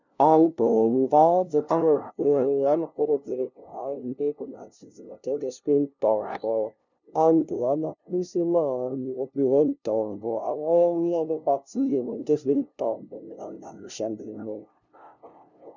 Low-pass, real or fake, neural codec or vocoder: 7.2 kHz; fake; codec, 16 kHz, 0.5 kbps, FunCodec, trained on LibriTTS, 25 frames a second